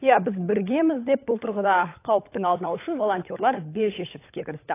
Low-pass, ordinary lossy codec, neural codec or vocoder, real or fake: 3.6 kHz; AAC, 24 kbps; codec, 16 kHz, 16 kbps, FunCodec, trained on LibriTTS, 50 frames a second; fake